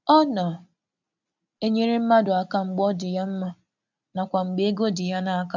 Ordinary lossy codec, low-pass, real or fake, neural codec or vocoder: none; 7.2 kHz; real; none